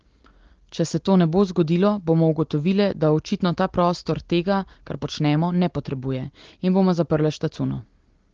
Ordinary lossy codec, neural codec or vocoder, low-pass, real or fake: Opus, 16 kbps; none; 7.2 kHz; real